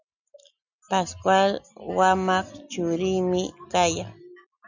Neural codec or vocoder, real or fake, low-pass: none; real; 7.2 kHz